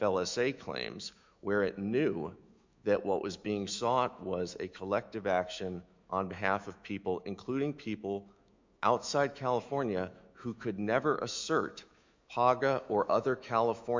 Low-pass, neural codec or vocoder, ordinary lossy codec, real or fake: 7.2 kHz; autoencoder, 48 kHz, 128 numbers a frame, DAC-VAE, trained on Japanese speech; MP3, 64 kbps; fake